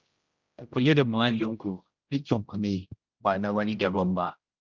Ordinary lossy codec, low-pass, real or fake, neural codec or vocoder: Opus, 24 kbps; 7.2 kHz; fake; codec, 16 kHz, 0.5 kbps, X-Codec, HuBERT features, trained on general audio